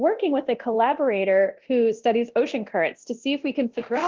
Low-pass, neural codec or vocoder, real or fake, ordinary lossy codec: 7.2 kHz; codec, 24 kHz, 0.9 kbps, DualCodec; fake; Opus, 16 kbps